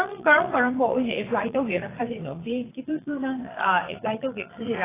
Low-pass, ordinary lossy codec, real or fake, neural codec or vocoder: 3.6 kHz; AAC, 16 kbps; fake; vocoder, 22.05 kHz, 80 mel bands, Vocos